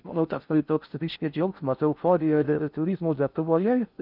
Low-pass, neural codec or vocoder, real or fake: 5.4 kHz; codec, 16 kHz in and 24 kHz out, 0.6 kbps, FocalCodec, streaming, 4096 codes; fake